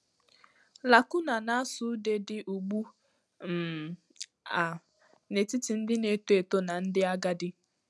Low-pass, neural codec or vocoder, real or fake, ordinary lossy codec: none; none; real; none